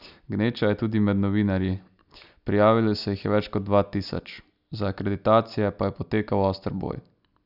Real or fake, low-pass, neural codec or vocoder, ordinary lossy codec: real; 5.4 kHz; none; none